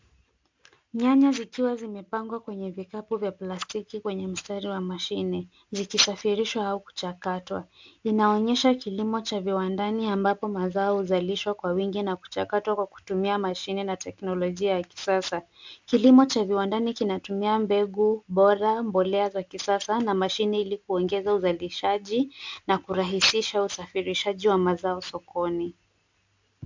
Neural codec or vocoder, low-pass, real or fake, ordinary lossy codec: none; 7.2 kHz; real; MP3, 64 kbps